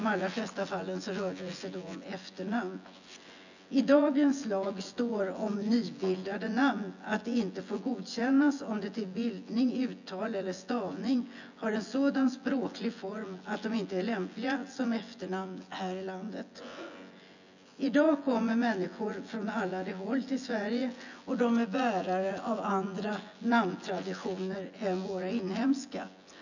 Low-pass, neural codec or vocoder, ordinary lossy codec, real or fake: 7.2 kHz; vocoder, 24 kHz, 100 mel bands, Vocos; none; fake